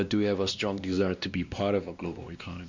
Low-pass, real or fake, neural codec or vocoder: 7.2 kHz; fake; codec, 16 kHz, 1 kbps, X-Codec, WavLM features, trained on Multilingual LibriSpeech